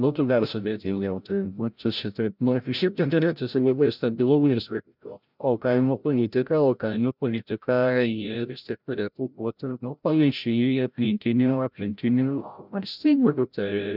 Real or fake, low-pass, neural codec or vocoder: fake; 5.4 kHz; codec, 16 kHz, 0.5 kbps, FreqCodec, larger model